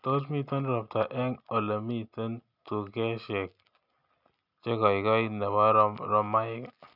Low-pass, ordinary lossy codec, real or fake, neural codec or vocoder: 5.4 kHz; none; real; none